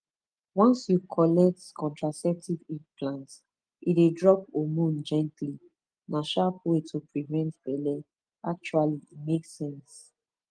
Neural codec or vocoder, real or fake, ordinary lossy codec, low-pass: none; real; Opus, 24 kbps; 9.9 kHz